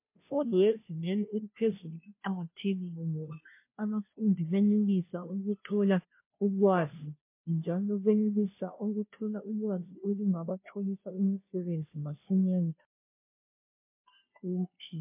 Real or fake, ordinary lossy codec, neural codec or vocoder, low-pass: fake; MP3, 24 kbps; codec, 16 kHz, 0.5 kbps, FunCodec, trained on Chinese and English, 25 frames a second; 3.6 kHz